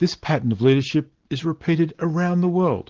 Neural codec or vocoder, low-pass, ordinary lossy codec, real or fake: none; 7.2 kHz; Opus, 16 kbps; real